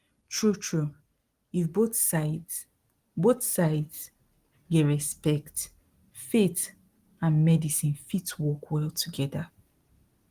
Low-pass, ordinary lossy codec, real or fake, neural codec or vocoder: 14.4 kHz; Opus, 24 kbps; real; none